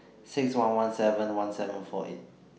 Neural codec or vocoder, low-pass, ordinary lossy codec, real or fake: none; none; none; real